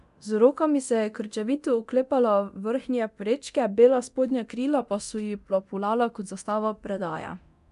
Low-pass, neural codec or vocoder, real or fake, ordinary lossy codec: 10.8 kHz; codec, 24 kHz, 0.9 kbps, DualCodec; fake; none